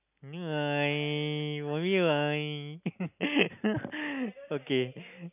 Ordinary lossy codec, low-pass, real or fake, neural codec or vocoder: none; 3.6 kHz; real; none